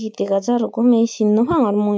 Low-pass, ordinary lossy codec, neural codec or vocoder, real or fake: none; none; none; real